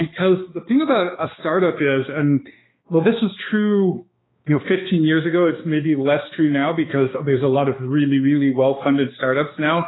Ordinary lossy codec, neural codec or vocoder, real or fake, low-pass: AAC, 16 kbps; codec, 16 kHz, 2 kbps, X-Codec, HuBERT features, trained on balanced general audio; fake; 7.2 kHz